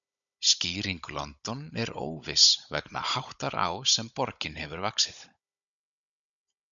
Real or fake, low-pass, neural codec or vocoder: fake; 7.2 kHz; codec, 16 kHz, 16 kbps, FunCodec, trained on Chinese and English, 50 frames a second